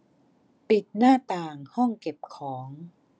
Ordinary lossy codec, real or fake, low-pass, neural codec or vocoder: none; real; none; none